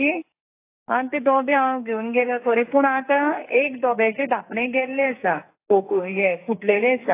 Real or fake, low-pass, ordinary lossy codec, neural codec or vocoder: fake; 3.6 kHz; AAC, 24 kbps; codec, 44.1 kHz, 2.6 kbps, SNAC